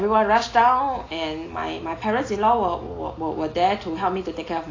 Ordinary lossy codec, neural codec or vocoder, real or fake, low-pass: AAC, 32 kbps; none; real; 7.2 kHz